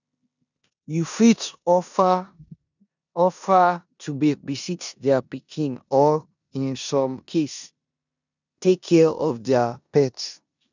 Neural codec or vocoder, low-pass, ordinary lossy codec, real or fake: codec, 16 kHz in and 24 kHz out, 0.9 kbps, LongCat-Audio-Codec, four codebook decoder; 7.2 kHz; none; fake